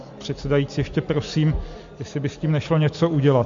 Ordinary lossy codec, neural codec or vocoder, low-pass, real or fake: MP3, 48 kbps; none; 7.2 kHz; real